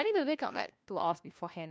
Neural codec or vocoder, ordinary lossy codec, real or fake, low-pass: codec, 16 kHz, 1 kbps, FunCodec, trained on LibriTTS, 50 frames a second; none; fake; none